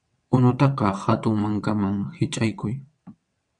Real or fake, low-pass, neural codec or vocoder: fake; 9.9 kHz; vocoder, 22.05 kHz, 80 mel bands, WaveNeXt